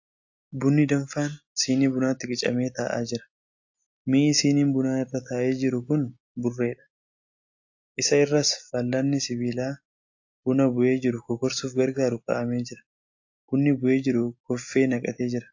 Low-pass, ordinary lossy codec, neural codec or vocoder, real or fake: 7.2 kHz; AAC, 48 kbps; none; real